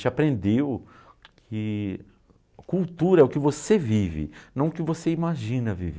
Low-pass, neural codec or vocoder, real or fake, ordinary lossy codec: none; none; real; none